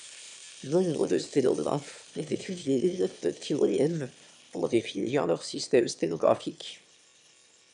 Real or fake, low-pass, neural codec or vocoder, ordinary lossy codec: fake; 9.9 kHz; autoencoder, 22.05 kHz, a latent of 192 numbers a frame, VITS, trained on one speaker; MP3, 96 kbps